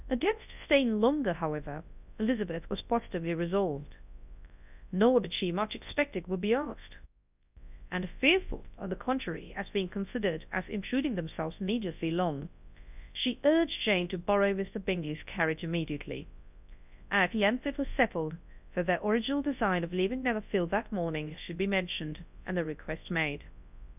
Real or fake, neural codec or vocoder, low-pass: fake; codec, 24 kHz, 0.9 kbps, WavTokenizer, large speech release; 3.6 kHz